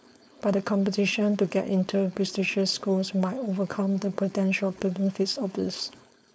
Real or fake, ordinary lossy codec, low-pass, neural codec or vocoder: fake; none; none; codec, 16 kHz, 4.8 kbps, FACodec